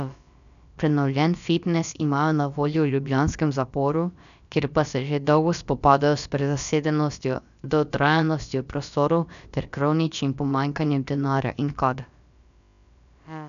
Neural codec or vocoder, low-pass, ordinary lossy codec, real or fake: codec, 16 kHz, about 1 kbps, DyCAST, with the encoder's durations; 7.2 kHz; none; fake